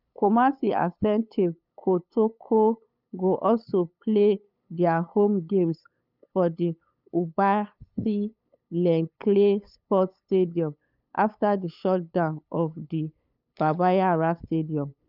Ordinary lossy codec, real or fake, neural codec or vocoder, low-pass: none; fake; codec, 16 kHz, 8 kbps, FunCodec, trained on LibriTTS, 25 frames a second; 5.4 kHz